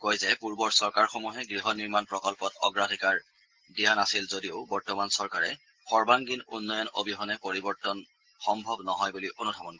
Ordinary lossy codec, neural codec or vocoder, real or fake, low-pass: Opus, 16 kbps; none; real; 7.2 kHz